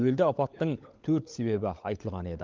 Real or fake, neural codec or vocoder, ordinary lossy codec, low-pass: fake; codec, 16 kHz, 16 kbps, FunCodec, trained on LibriTTS, 50 frames a second; Opus, 32 kbps; 7.2 kHz